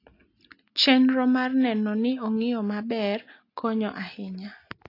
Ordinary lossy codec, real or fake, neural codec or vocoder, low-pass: AAC, 32 kbps; real; none; 5.4 kHz